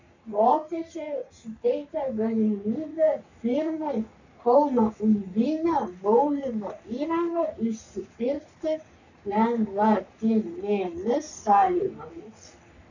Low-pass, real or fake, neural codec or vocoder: 7.2 kHz; fake; codec, 44.1 kHz, 3.4 kbps, Pupu-Codec